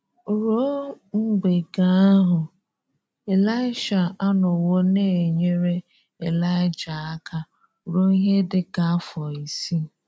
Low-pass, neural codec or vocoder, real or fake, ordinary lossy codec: none; none; real; none